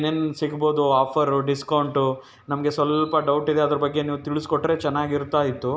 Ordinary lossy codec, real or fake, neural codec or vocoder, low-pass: none; real; none; none